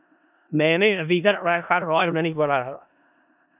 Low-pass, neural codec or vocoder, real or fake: 3.6 kHz; codec, 16 kHz in and 24 kHz out, 0.4 kbps, LongCat-Audio-Codec, four codebook decoder; fake